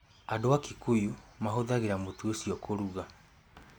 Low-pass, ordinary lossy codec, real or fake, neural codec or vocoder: none; none; real; none